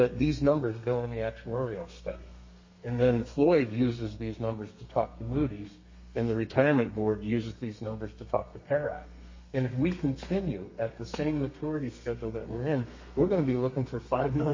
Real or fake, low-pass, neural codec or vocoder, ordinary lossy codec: fake; 7.2 kHz; codec, 32 kHz, 1.9 kbps, SNAC; MP3, 32 kbps